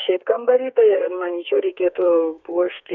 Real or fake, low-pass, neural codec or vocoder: fake; 7.2 kHz; codec, 32 kHz, 1.9 kbps, SNAC